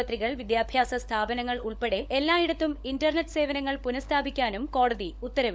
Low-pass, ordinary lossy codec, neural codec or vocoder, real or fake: none; none; codec, 16 kHz, 16 kbps, FunCodec, trained on LibriTTS, 50 frames a second; fake